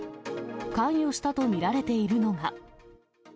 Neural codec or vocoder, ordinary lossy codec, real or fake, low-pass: none; none; real; none